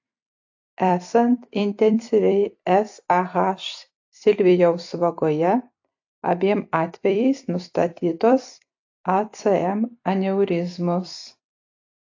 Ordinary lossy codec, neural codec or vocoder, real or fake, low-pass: AAC, 48 kbps; vocoder, 44.1 kHz, 128 mel bands every 256 samples, BigVGAN v2; fake; 7.2 kHz